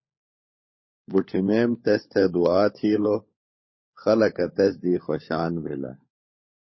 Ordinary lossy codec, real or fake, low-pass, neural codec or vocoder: MP3, 24 kbps; fake; 7.2 kHz; codec, 16 kHz, 16 kbps, FunCodec, trained on LibriTTS, 50 frames a second